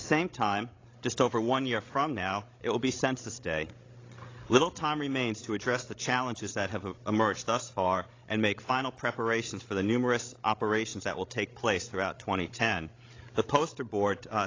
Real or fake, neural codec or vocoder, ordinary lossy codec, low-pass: fake; codec, 16 kHz, 16 kbps, FreqCodec, larger model; AAC, 32 kbps; 7.2 kHz